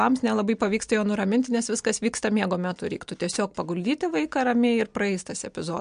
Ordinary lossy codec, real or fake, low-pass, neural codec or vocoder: MP3, 64 kbps; real; 9.9 kHz; none